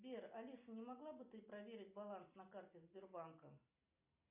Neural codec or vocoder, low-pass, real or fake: none; 3.6 kHz; real